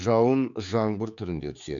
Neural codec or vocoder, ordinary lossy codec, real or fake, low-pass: codec, 16 kHz, 4 kbps, X-Codec, HuBERT features, trained on general audio; none; fake; 7.2 kHz